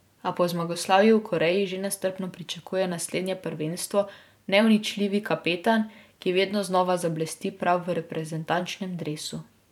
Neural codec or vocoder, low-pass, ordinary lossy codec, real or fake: vocoder, 44.1 kHz, 128 mel bands every 512 samples, BigVGAN v2; 19.8 kHz; none; fake